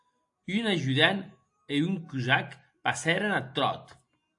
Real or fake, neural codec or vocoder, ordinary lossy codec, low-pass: real; none; AAC, 64 kbps; 10.8 kHz